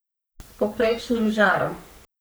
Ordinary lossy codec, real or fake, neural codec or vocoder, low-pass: none; fake; codec, 44.1 kHz, 3.4 kbps, Pupu-Codec; none